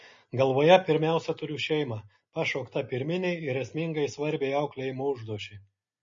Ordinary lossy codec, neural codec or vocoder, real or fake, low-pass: MP3, 32 kbps; none; real; 7.2 kHz